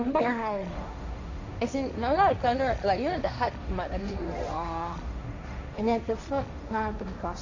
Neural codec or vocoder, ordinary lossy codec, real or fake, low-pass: codec, 16 kHz, 1.1 kbps, Voila-Tokenizer; none; fake; 7.2 kHz